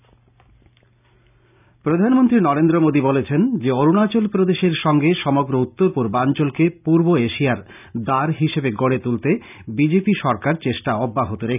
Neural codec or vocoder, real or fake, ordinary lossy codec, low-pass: none; real; none; 3.6 kHz